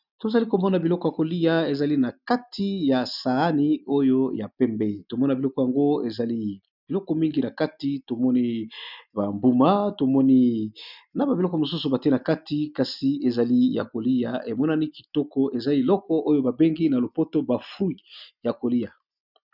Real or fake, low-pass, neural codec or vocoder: real; 5.4 kHz; none